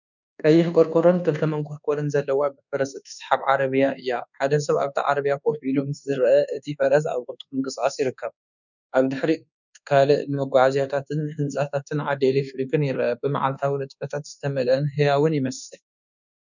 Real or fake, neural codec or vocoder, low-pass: fake; codec, 24 kHz, 1.2 kbps, DualCodec; 7.2 kHz